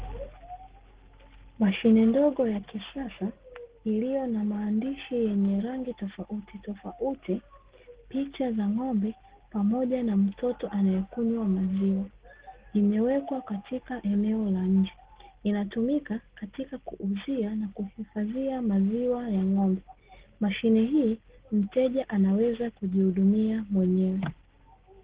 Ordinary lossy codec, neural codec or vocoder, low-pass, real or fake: Opus, 16 kbps; none; 3.6 kHz; real